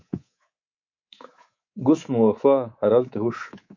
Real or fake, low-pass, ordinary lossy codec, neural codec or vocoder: fake; 7.2 kHz; MP3, 48 kbps; codec, 24 kHz, 3.1 kbps, DualCodec